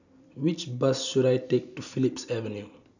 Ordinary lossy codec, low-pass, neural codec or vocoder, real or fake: none; 7.2 kHz; none; real